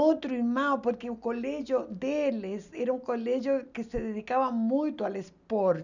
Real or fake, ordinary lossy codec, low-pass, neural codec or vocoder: real; none; 7.2 kHz; none